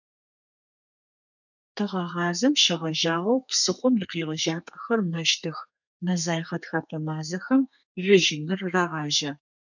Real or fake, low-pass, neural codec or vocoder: fake; 7.2 kHz; codec, 32 kHz, 1.9 kbps, SNAC